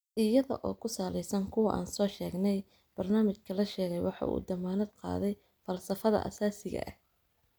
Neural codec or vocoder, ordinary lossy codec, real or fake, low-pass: none; none; real; none